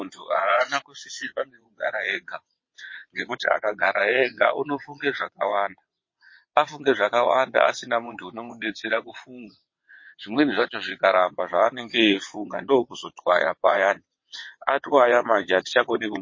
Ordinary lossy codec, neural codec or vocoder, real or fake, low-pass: MP3, 32 kbps; vocoder, 22.05 kHz, 80 mel bands, WaveNeXt; fake; 7.2 kHz